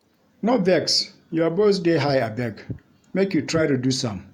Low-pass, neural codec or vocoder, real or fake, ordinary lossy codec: 19.8 kHz; vocoder, 48 kHz, 128 mel bands, Vocos; fake; none